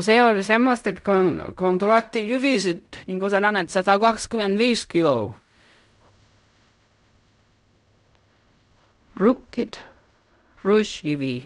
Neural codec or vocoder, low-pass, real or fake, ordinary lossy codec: codec, 16 kHz in and 24 kHz out, 0.4 kbps, LongCat-Audio-Codec, fine tuned four codebook decoder; 10.8 kHz; fake; none